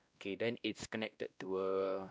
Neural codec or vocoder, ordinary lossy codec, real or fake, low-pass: codec, 16 kHz, 1 kbps, X-Codec, WavLM features, trained on Multilingual LibriSpeech; none; fake; none